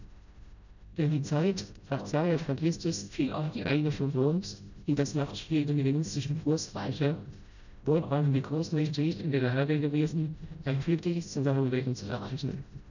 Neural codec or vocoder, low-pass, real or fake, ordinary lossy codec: codec, 16 kHz, 0.5 kbps, FreqCodec, smaller model; 7.2 kHz; fake; none